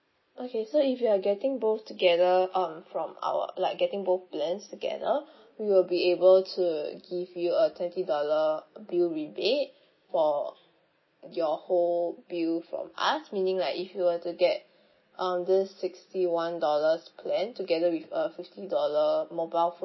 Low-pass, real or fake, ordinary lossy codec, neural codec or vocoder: 7.2 kHz; real; MP3, 24 kbps; none